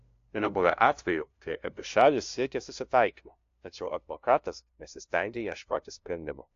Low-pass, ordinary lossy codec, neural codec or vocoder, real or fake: 7.2 kHz; AAC, 48 kbps; codec, 16 kHz, 0.5 kbps, FunCodec, trained on LibriTTS, 25 frames a second; fake